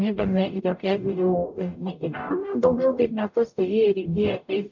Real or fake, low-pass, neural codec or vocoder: fake; 7.2 kHz; codec, 44.1 kHz, 0.9 kbps, DAC